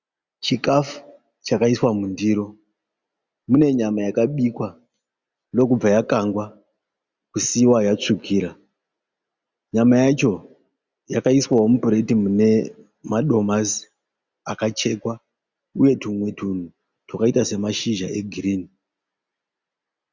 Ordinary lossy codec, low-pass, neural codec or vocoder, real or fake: Opus, 64 kbps; 7.2 kHz; none; real